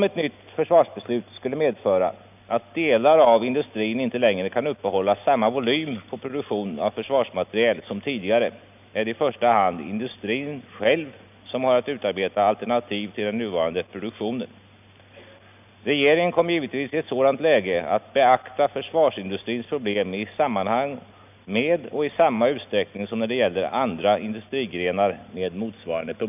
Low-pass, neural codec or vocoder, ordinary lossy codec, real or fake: 3.6 kHz; none; none; real